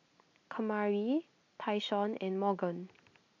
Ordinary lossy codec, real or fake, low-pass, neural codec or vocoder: AAC, 48 kbps; real; 7.2 kHz; none